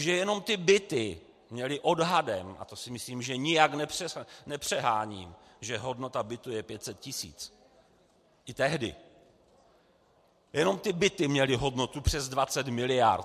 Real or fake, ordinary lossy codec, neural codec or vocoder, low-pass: real; MP3, 64 kbps; none; 14.4 kHz